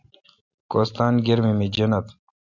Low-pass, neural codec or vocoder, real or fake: 7.2 kHz; none; real